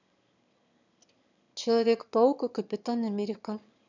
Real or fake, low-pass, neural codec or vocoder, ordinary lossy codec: fake; 7.2 kHz; autoencoder, 22.05 kHz, a latent of 192 numbers a frame, VITS, trained on one speaker; none